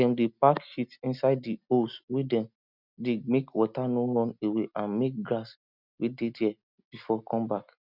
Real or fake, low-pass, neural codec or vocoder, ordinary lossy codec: real; 5.4 kHz; none; none